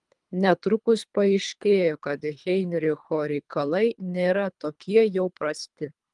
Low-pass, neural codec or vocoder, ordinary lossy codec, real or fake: 10.8 kHz; codec, 24 kHz, 3 kbps, HILCodec; Opus, 32 kbps; fake